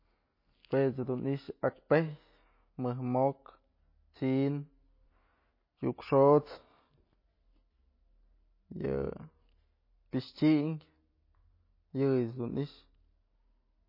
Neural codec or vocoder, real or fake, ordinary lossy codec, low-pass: none; real; MP3, 24 kbps; 5.4 kHz